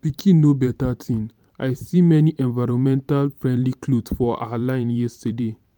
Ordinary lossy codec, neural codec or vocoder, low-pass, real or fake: none; vocoder, 44.1 kHz, 128 mel bands every 512 samples, BigVGAN v2; 19.8 kHz; fake